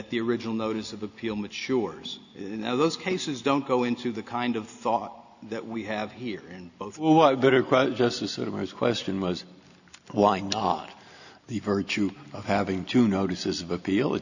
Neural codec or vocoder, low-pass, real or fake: none; 7.2 kHz; real